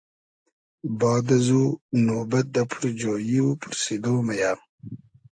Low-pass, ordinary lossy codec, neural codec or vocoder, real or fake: 9.9 kHz; AAC, 64 kbps; none; real